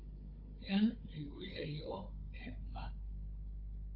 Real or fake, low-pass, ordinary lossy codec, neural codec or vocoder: fake; 5.4 kHz; AAC, 32 kbps; codec, 16 kHz, 4 kbps, FunCodec, trained on Chinese and English, 50 frames a second